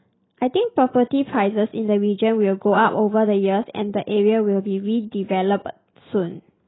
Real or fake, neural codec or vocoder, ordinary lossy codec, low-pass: real; none; AAC, 16 kbps; 7.2 kHz